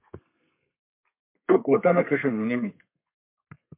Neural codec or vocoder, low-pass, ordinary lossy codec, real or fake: codec, 32 kHz, 1.9 kbps, SNAC; 3.6 kHz; MP3, 32 kbps; fake